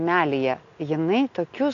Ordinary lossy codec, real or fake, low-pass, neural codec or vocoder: AAC, 48 kbps; real; 7.2 kHz; none